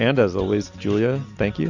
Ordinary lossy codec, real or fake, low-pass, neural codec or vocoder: Opus, 64 kbps; real; 7.2 kHz; none